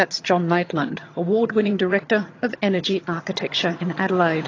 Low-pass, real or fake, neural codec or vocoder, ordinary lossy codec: 7.2 kHz; fake; vocoder, 22.05 kHz, 80 mel bands, HiFi-GAN; AAC, 32 kbps